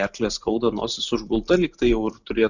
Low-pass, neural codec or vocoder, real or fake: 7.2 kHz; none; real